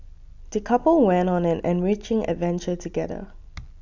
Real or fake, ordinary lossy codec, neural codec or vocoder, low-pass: real; none; none; 7.2 kHz